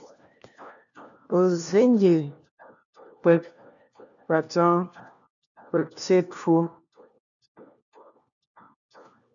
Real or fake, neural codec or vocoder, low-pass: fake; codec, 16 kHz, 1 kbps, FunCodec, trained on LibriTTS, 50 frames a second; 7.2 kHz